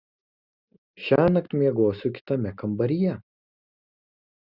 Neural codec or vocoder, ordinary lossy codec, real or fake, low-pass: none; Opus, 64 kbps; real; 5.4 kHz